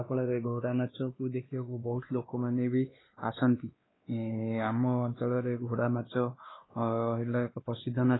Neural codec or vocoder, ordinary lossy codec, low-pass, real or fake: codec, 16 kHz, 2 kbps, X-Codec, WavLM features, trained on Multilingual LibriSpeech; AAC, 16 kbps; 7.2 kHz; fake